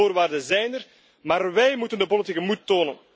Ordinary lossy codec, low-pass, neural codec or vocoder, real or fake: none; none; none; real